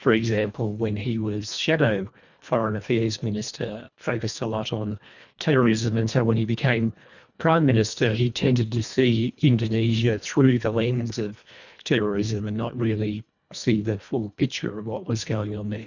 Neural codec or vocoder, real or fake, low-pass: codec, 24 kHz, 1.5 kbps, HILCodec; fake; 7.2 kHz